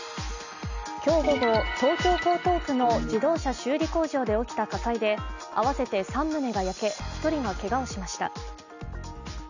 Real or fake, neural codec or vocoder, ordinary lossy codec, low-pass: real; none; none; 7.2 kHz